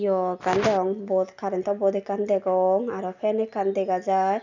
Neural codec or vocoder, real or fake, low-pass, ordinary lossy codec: none; real; 7.2 kHz; none